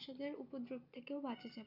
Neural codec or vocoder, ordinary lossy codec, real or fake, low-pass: none; none; real; 5.4 kHz